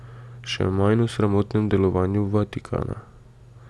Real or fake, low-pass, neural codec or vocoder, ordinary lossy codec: real; none; none; none